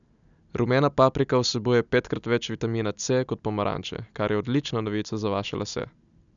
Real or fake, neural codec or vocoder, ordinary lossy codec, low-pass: real; none; none; 7.2 kHz